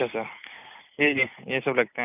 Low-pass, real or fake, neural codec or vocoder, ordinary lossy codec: 3.6 kHz; real; none; none